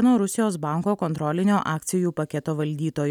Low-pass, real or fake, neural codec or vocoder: 19.8 kHz; real; none